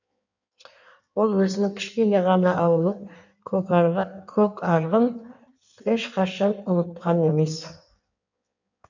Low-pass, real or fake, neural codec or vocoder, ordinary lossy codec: 7.2 kHz; fake; codec, 16 kHz in and 24 kHz out, 1.1 kbps, FireRedTTS-2 codec; none